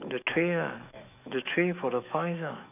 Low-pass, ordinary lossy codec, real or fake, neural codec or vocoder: 3.6 kHz; none; fake; autoencoder, 48 kHz, 128 numbers a frame, DAC-VAE, trained on Japanese speech